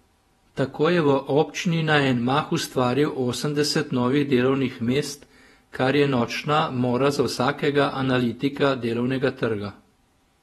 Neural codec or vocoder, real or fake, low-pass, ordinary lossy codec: vocoder, 48 kHz, 128 mel bands, Vocos; fake; 19.8 kHz; AAC, 32 kbps